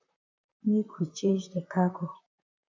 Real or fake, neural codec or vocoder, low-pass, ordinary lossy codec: fake; vocoder, 44.1 kHz, 128 mel bands, Pupu-Vocoder; 7.2 kHz; MP3, 48 kbps